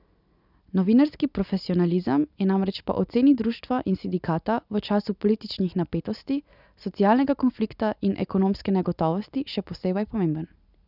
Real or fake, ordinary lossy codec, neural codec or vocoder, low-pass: real; none; none; 5.4 kHz